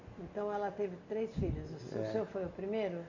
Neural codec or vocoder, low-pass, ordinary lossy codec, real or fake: none; 7.2 kHz; none; real